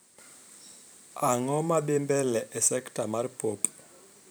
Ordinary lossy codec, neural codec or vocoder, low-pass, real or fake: none; vocoder, 44.1 kHz, 128 mel bands every 512 samples, BigVGAN v2; none; fake